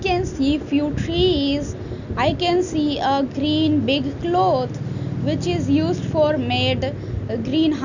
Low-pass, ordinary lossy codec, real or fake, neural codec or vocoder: 7.2 kHz; none; real; none